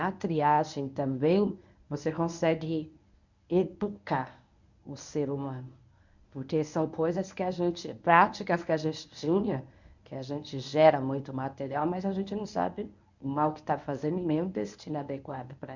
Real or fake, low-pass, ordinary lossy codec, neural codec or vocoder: fake; 7.2 kHz; none; codec, 24 kHz, 0.9 kbps, WavTokenizer, medium speech release version 1